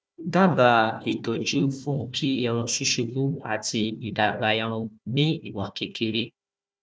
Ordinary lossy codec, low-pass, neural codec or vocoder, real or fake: none; none; codec, 16 kHz, 1 kbps, FunCodec, trained on Chinese and English, 50 frames a second; fake